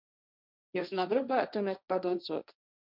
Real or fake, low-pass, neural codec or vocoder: fake; 5.4 kHz; codec, 16 kHz, 1.1 kbps, Voila-Tokenizer